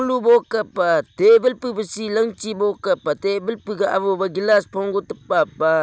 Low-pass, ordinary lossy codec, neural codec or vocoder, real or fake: none; none; none; real